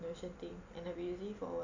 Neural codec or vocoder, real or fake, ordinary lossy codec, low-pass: none; real; Opus, 64 kbps; 7.2 kHz